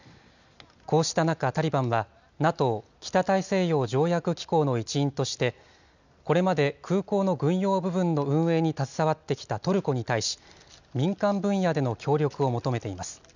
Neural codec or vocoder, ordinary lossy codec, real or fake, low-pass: none; none; real; 7.2 kHz